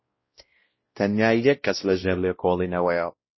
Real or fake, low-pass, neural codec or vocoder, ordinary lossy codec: fake; 7.2 kHz; codec, 16 kHz, 0.5 kbps, X-Codec, WavLM features, trained on Multilingual LibriSpeech; MP3, 24 kbps